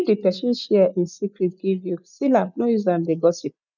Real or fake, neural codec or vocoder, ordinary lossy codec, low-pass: real; none; none; 7.2 kHz